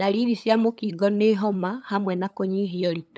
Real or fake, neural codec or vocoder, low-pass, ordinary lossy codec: fake; codec, 16 kHz, 8 kbps, FunCodec, trained on LibriTTS, 25 frames a second; none; none